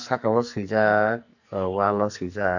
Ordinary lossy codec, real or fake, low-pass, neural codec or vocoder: none; fake; 7.2 kHz; codec, 44.1 kHz, 2.6 kbps, SNAC